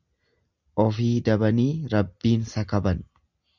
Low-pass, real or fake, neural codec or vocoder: 7.2 kHz; real; none